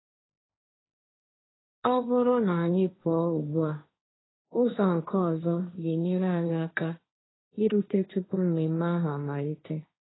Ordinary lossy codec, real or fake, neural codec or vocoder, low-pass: AAC, 16 kbps; fake; codec, 16 kHz, 1.1 kbps, Voila-Tokenizer; 7.2 kHz